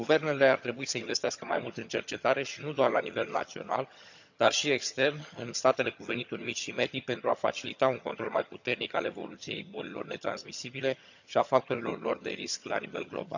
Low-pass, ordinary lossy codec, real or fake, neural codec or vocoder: 7.2 kHz; none; fake; vocoder, 22.05 kHz, 80 mel bands, HiFi-GAN